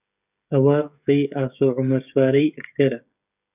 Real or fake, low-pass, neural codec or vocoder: fake; 3.6 kHz; codec, 16 kHz, 16 kbps, FreqCodec, smaller model